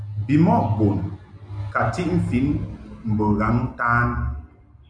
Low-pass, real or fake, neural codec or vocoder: 9.9 kHz; real; none